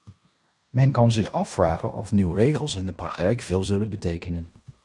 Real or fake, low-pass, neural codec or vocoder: fake; 10.8 kHz; codec, 16 kHz in and 24 kHz out, 0.9 kbps, LongCat-Audio-Codec, fine tuned four codebook decoder